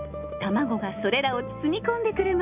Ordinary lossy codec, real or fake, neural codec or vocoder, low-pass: none; real; none; 3.6 kHz